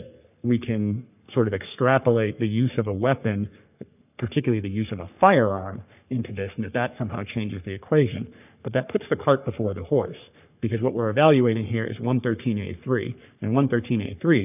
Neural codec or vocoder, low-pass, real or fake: codec, 44.1 kHz, 3.4 kbps, Pupu-Codec; 3.6 kHz; fake